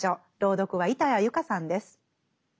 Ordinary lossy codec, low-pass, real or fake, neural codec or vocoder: none; none; real; none